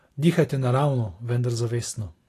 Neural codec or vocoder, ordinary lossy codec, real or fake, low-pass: none; AAC, 48 kbps; real; 14.4 kHz